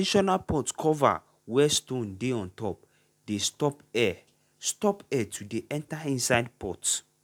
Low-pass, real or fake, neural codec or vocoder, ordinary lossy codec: 19.8 kHz; real; none; none